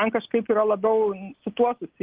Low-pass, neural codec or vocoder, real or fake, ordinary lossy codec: 3.6 kHz; none; real; Opus, 16 kbps